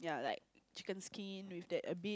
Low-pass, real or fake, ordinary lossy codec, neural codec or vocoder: none; real; none; none